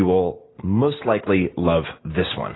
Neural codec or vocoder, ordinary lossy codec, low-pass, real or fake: none; AAC, 16 kbps; 7.2 kHz; real